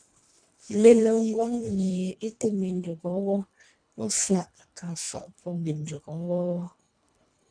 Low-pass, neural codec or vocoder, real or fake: 9.9 kHz; codec, 24 kHz, 1.5 kbps, HILCodec; fake